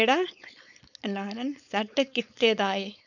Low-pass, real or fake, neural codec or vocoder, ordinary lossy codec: 7.2 kHz; fake; codec, 16 kHz, 4.8 kbps, FACodec; none